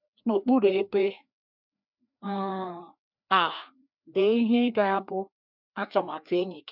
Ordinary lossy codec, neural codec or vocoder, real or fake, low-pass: none; codec, 16 kHz, 2 kbps, FreqCodec, larger model; fake; 5.4 kHz